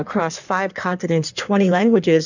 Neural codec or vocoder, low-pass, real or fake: codec, 16 kHz in and 24 kHz out, 1.1 kbps, FireRedTTS-2 codec; 7.2 kHz; fake